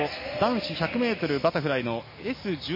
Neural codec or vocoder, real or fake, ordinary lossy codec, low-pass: none; real; MP3, 24 kbps; 5.4 kHz